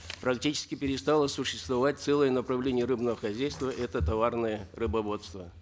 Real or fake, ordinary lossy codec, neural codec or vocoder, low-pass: real; none; none; none